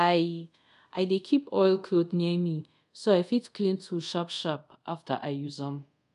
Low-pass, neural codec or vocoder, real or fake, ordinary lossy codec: 10.8 kHz; codec, 24 kHz, 0.5 kbps, DualCodec; fake; none